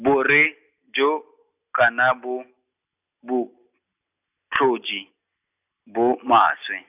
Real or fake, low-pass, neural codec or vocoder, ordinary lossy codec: real; 3.6 kHz; none; none